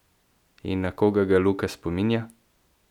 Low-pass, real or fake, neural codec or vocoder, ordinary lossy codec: 19.8 kHz; real; none; none